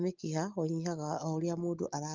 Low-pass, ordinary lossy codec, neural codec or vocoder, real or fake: 7.2 kHz; Opus, 24 kbps; none; real